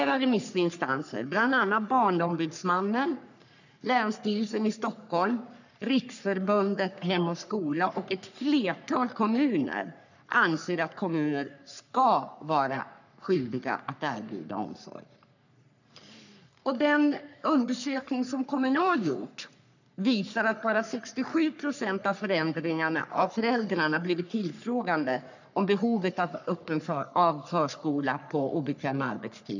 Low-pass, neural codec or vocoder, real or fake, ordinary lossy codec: 7.2 kHz; codec, 44.1 kHz, 3.4 kbps, Pupu-Codec; fake; none